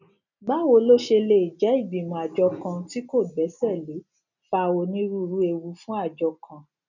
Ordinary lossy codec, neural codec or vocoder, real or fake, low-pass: none; none; real; 7.2 kHz